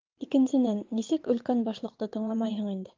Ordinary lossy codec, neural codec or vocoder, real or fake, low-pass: Opus, 32 kbps; vocoder, 22.05 kHz, 80 mel bands, WaveNeXt; fake; 7.2 kHz